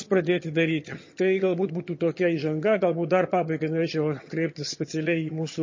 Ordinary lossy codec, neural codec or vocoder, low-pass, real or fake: MP3, 32 kbps; vocoder, 22.05 kHz, 80 mel bands, HiFi-GAN; 7.2 kHz; fake